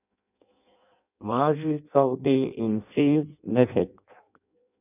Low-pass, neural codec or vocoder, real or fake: 3.6 kHz; codec, 16 kHz in and 24 kHz out, 0.6 kbps, FireRedTTS-2 codec; fake